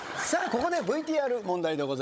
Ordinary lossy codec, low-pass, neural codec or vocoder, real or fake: none; none; codec, 16 kHz, 16 kbps, FunCodec, trained on Chinese and English, 50 frames a second; fake